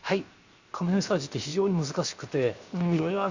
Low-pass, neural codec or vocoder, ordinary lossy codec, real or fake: 7.2 kHz; codec, 16 kHz, 0.7 kbps, FocalCodec; Opus, 64 kbps; fake